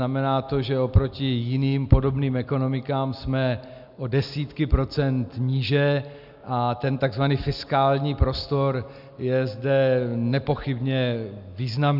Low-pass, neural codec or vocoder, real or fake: 5.4 kHz; none; real